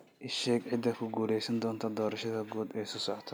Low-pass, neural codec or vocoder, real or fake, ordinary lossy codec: none; none; real; none